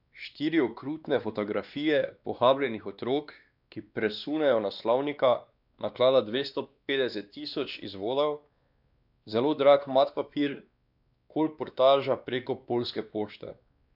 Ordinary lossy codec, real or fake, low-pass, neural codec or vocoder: none; fake; 5.4 kHz; codec, 16 kHz, 2 kbps, X-Codec, WavLM features, trained on Multilingual LibriSpeech